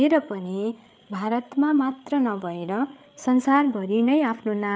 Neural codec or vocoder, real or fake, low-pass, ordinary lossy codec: codec, 16 kHz, 8 kbps, FreqCodec, larger model; fake; none; none